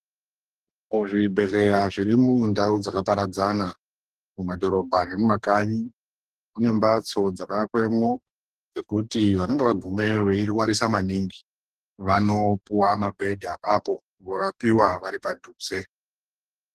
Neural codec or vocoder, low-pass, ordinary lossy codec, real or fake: codec, 44.1 kHz, 2.6 kbps, DAC; 14.4 kHz; Opus, 16 kbps; fake